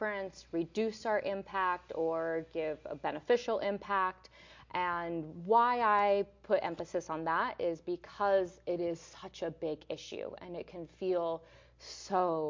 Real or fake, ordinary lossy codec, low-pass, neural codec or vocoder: real; MP3, 48 kbps; 7.2 kHz; none